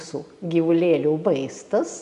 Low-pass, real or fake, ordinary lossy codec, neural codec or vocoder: 10.8 kHz; real; AAC, 96 kbps; none